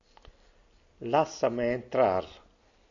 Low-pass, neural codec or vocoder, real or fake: 7.2 kHz; none; real